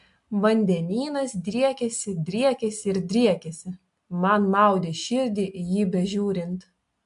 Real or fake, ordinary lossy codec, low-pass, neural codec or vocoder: real; AAC, 64 kbps; 10.8 kHz; none